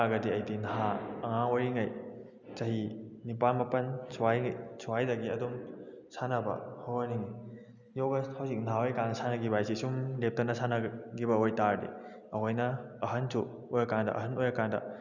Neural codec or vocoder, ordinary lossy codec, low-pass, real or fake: none; none; 7.2 kHz; real